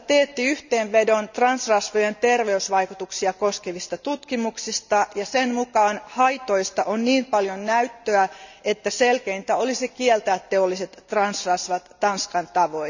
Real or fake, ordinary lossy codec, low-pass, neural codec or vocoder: real; none; 7.2 kHz; none